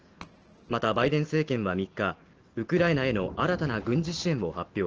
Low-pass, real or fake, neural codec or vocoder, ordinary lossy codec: 7.2 kHz; real; none; Opus, 16 kbps